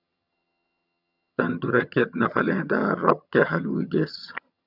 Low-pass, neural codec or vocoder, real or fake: 5.4 kHz; vocoder, 22.05 kHz, 80 mel bands, HiFi-GAN; fake